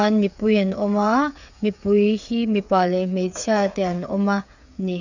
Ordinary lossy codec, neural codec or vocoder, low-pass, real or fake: none; codec, 16 kHz, 8 kbps, FreqCodec, smaller model; 7.2 kHz; fake